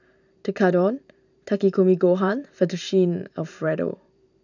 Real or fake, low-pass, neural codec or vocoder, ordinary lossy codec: fake; 7.2 kHz; vocoder, 44.1 kHz, 128 mel bands every 512 samples, BigVGAN v2; none